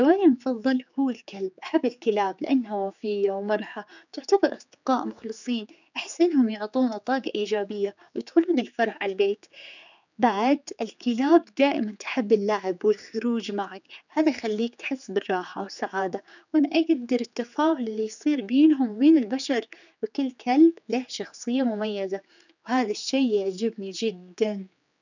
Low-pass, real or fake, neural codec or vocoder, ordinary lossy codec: 7.2 kHz; fake; codec, 16 kHz, 4 kbps, X-Codec, HuBERT features, trained on general audio; none